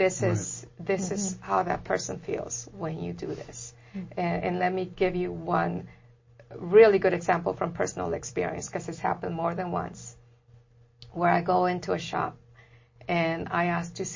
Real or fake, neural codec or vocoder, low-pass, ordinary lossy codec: real; none; 7.2 kHz; MP3, 32 kbps